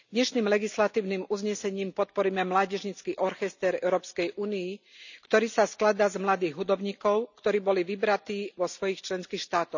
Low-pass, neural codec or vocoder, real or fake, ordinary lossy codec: 7.2 kHz; none; real; none